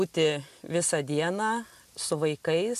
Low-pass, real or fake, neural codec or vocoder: 14.4 kHz; real; none